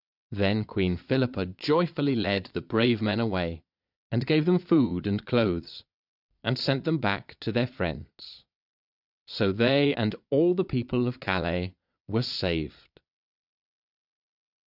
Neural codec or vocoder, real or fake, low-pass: vocoder, 22.05 kHz, 80 mel bands, Vocos; fake; 5.4 kHz